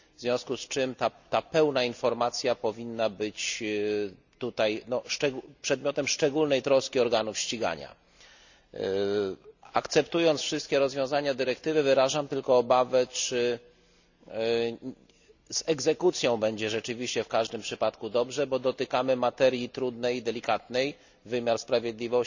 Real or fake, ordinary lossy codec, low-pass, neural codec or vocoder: real; none; 7.2 kHz; none